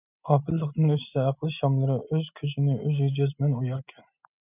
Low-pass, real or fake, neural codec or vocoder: 3.6 kHz; real; none